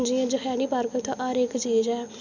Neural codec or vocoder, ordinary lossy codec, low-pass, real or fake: none; none; 7.2 kHz; real